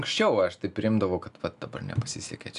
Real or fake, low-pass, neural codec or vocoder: real; 10.8 kHz; none